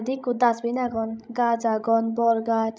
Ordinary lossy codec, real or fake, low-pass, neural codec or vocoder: none; fake; none; codec, 16 kHz, 16 kbps, FreqCodec, larger model